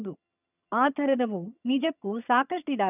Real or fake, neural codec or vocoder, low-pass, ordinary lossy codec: fake; vocoder, 22.05 kHz, 80 mel bands, HiFi-GAN; 3.6 kHz; none